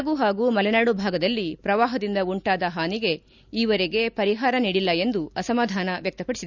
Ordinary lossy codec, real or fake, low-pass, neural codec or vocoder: none; real; 7.2 kHz; none